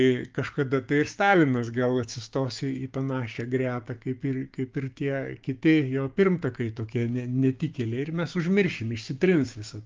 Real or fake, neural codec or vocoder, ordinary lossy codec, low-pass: fake; codec, 16 kHz, 6 kbps, DAC; Opus, 24 kbps; 7.2 kHz